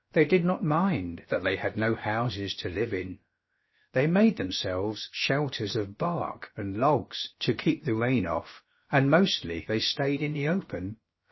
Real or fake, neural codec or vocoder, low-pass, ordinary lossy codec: fake; codec, 16 kHz, about 1 kbps, DyCAST, with the encoder's durations; 7.2 kHz; MP3, 24 kbps